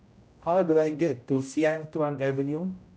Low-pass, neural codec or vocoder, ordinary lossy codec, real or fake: none; codec, 16 kHz, 0.5 kbps, X-Codec, HuBERT features, trained on general audio; none; fake